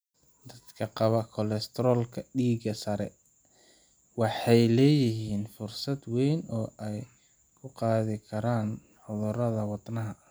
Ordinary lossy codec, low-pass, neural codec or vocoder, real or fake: none; none; none; real